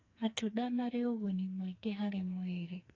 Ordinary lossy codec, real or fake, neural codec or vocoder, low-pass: AAC, 32 kbps; fake; codec, 44.1 kHz, 2.6 kbps, SNAC; 7.2 kHz